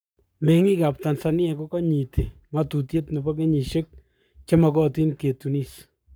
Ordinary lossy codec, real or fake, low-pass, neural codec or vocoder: none; fake; none; codec, 44.1 kHz, 7.8 kbps, Pupu-Codec